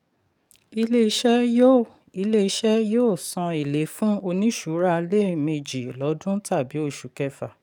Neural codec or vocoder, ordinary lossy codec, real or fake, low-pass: codec, 44.1 kHz, 7.8 kbps, DAC; none; fake; 19.8 kHz